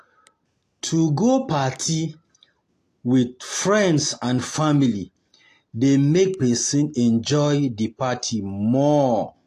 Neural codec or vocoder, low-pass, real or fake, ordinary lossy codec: none; 14.4 kHz; real; AAC, 48 kbps